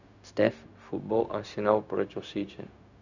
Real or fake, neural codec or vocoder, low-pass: fake; codec, 16 kHz, 0.4 kbps, LongCat-Audio-Codec; 7.2 kHz